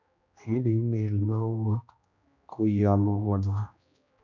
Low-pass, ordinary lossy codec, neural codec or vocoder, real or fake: 7.2 kHz; none; codec, 16 kHz, 1 kbps, X-Codec, HuBERT features, trained on general audio; fake